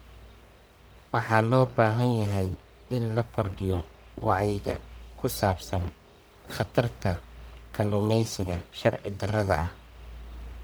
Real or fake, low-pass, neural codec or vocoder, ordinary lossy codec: fake; none; codec, 44.1 kHz, 1.7 kbps, Pupu-Codec; none